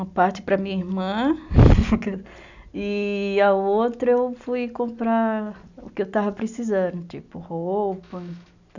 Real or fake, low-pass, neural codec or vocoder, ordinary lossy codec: real; 7.2 kHz; none; none